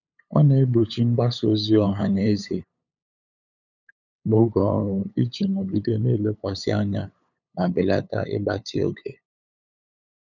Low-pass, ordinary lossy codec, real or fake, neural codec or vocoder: 7.2 kHz; none; fake; codec, 16 kHz, 8 kbps, FunCodec, trained on LibriTTS, 25 frames a second